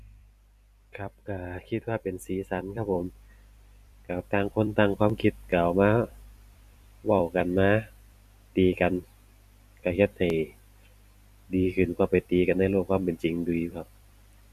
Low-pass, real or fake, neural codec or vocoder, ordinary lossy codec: 14.4 kHz; fake; vocoder, 44.1 kHz, 128 mel bands every 256 samples, BigVGAN v2; none